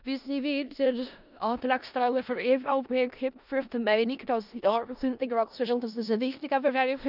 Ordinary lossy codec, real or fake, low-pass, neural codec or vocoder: none; fake; 5.4 kHz; codec, 16 kHz in and 24 kHz out, 0.4 kbps, LongCat-Audio-Codec, four codebook decoder